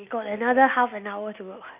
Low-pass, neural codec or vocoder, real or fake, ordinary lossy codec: 3.6 kHz; none; real; none